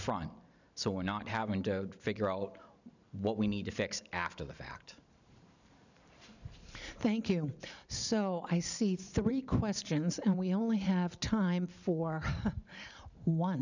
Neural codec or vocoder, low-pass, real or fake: vocoder, 44.1 kHz, 128 mel bands every 512 samples, BigVGAN v2; 7.2 kHz; fake